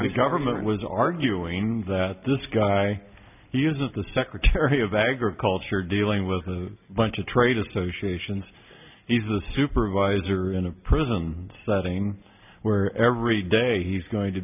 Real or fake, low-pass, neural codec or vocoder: real; 3.6 kHz; none